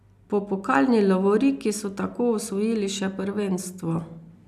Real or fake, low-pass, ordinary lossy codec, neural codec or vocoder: real; 14.4 kHz; none; none